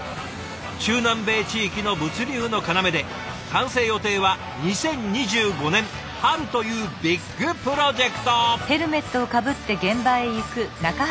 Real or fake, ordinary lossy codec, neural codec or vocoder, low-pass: real; none; none; none